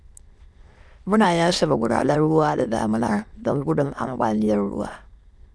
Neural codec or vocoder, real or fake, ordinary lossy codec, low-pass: autoencoder, 22.05 kHz, a latent of 192 numbers a frame, VITS, trained on many speakers; fake; none; none